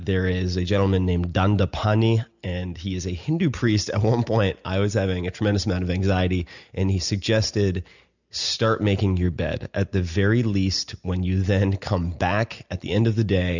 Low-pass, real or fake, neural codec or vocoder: 7.2 kHz; real; none